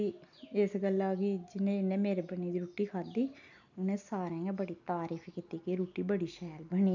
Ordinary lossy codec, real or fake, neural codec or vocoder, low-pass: none; real; none; 7.2 kHz